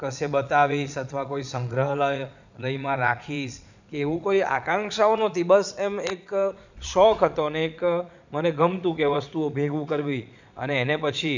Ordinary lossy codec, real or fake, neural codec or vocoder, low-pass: none; fake; vocoder, 22.05 kHz, 80 mel bands, Vocos; 7.2 kHz